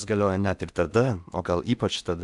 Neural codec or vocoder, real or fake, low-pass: codec, 16 kHz in and 24 kHz out, 0.8 kbps, FocalCodec, streaming, 65536 codes; fake; 10.8 kHz